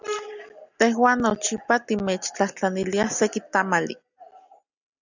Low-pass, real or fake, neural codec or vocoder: 7.2 kHz; real; none